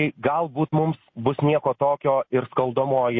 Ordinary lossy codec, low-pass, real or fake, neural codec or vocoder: MP3, 32 kbps; 7.2 kHz; real; none